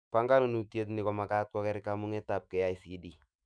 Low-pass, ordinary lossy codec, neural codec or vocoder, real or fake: 9.9 kHz; none; autoencoder, 48 kHz, 128 numbers a frame, DAC-VAE, trained on Japanese speech; fake